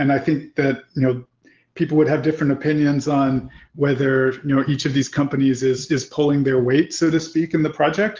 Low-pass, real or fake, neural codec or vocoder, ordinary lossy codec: 7.2 kHz; real; none; Opus, 16 kbps